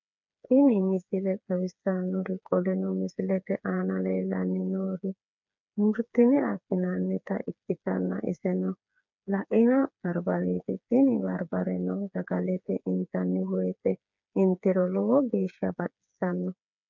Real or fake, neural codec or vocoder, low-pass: fake; codec, 16 kHz, 4 kbps, FreqCodec, smaller model; 7.2 kHz